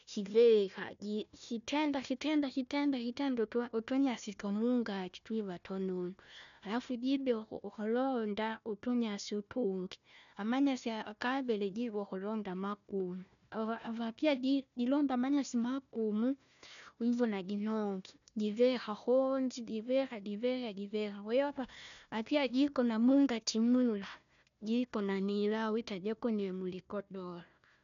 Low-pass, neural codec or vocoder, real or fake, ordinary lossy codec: 7.2 kHz; codec, 16 kHz, 1 kbps, FunCodec, trained on Chinese and English, 50 frames a second; fake; none